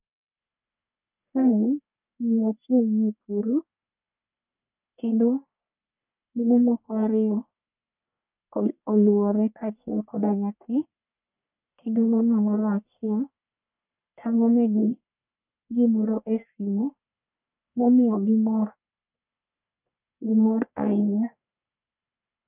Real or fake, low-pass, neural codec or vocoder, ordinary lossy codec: fake; 3.6 kHz; codec, 44.1 kHz, 1.7 kbps, Pupu-Codec; none